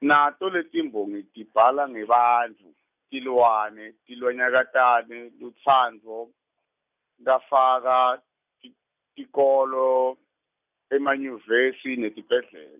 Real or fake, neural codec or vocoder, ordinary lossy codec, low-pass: real; none; none; 3.6 kHz